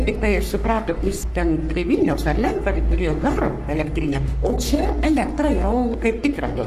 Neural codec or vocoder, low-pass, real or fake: codec, 44.1 kHz, 3.4 kbps, Pupu-Codec; 14.4 kHz; fake